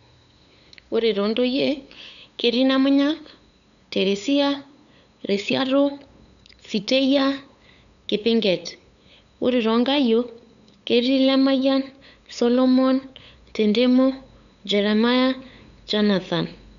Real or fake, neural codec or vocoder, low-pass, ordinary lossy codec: fake; codec, 16 kHz, 8 kbps, FunCodec, trained on LibriTTS, 25 frames a second; 7.2 kHz; none